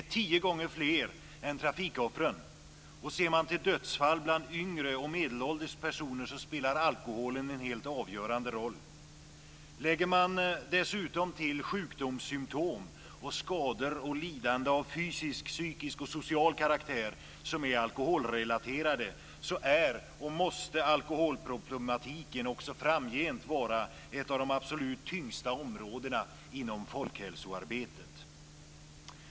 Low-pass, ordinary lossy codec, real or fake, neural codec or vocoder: none; none; real; none